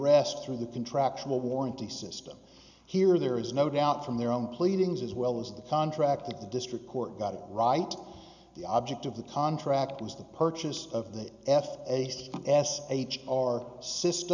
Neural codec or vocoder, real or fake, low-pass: none; real; 7.2 kHz